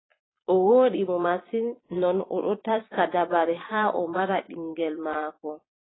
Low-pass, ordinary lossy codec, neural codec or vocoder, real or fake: 7.2 kHz; AAC, 16 kbps; vocoder, 22.05 kHz, 80 mel bands, Vocos; fake